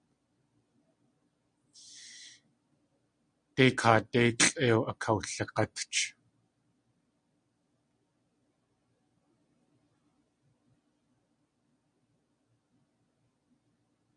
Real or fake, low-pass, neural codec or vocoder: real; 9.9 kHz; none